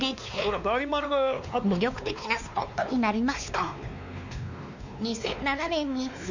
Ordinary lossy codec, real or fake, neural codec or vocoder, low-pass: none; fake; codec, 16 kHz, 2 kbps, X-Codec, WavLM features, trained on Multilingual LibriSpeech; 7.2 kHz